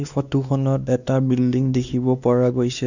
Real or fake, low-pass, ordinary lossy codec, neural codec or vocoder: fake; 7.2 kHz; none; codec, 16 kHz, 2 kbps, X-Codec, WavLM features, trained on Multilingual LibriSpeech